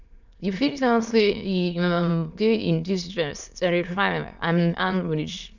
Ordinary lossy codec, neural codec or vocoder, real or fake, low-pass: none; autoencoder, 22.05 kHz, a latent of 192 numbers a frame, VITS, trained on many speakers; fake; 7.2 kHz